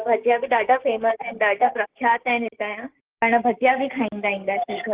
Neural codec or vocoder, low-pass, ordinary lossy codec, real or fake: codec, 16 kHz, 6 kbps, DAC; 3.6 kHz; Opus, 16 kbps; fake